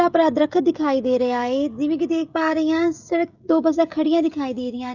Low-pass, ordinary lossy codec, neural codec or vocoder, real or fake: 7.2 kHz; none; codec, 16 kHz, 16 kbps, FreqCodec, smaller model; fake